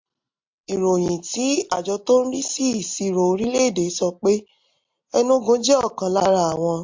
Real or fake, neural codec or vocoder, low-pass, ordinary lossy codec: real; none; 7.2 kHz; MP3, 48 kbps